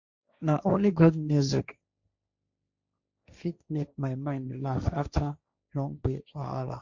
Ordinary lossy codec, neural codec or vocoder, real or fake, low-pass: Opus, 64 kbps; codec, 16 kHz, 1.1 kbps, Voila-Tokenizer; fake; 7.2 kHz